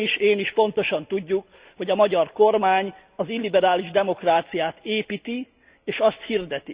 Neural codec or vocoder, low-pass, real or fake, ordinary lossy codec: none; 3.6 kHz; real; Opus, 64 kbps